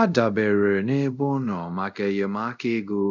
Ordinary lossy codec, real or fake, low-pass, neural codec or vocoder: none; fake; 7.2 kHz; codec, 24 kHz, 0.5 kbps, DualCodec